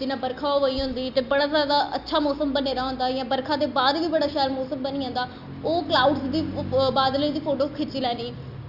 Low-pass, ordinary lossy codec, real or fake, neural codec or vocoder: 5.4 kHz; Opus, 64 kbps; real; none